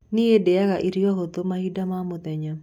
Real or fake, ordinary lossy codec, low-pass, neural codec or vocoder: real; none; 19.8 kHz; none